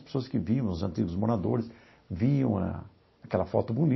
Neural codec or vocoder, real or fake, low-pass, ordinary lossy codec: none; real; 7.2 kHz; MP3, 24 kbps